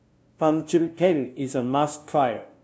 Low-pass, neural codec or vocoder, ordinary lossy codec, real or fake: none; codec, 16 kHz, 0.5 kbps, FunCodec, trained on LibriTTS, 25 frames a second; none; fake